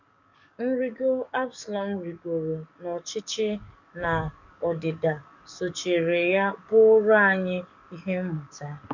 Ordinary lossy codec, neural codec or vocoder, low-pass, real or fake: none; codec, 44.1 kHz, 7.8 kbps, DAC; 7.2 kHz; fake